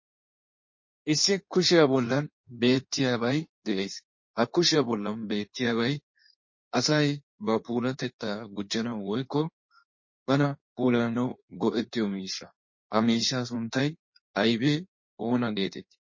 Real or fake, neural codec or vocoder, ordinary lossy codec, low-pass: fake; codec, 16 kHz in and 24 kHz out, 1.1 kbps, FireRedTTS-2 codec; MP3, 32 kbps; 7.2 kHz